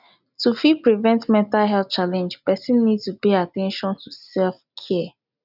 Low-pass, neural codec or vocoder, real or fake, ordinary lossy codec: 5.4 kHz; none; real; none